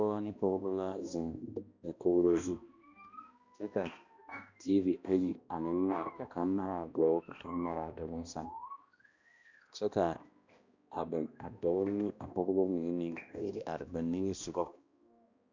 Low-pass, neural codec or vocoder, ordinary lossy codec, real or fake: 7.2 kHz; codec, 16 kHz, 1 kbps, X-Codec, HuBERT features, trained on balanced general audio; Opus, 64 kbps; fake